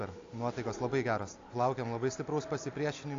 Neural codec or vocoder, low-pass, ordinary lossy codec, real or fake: none; 7.2 kHz; AAC, 48 kbps; real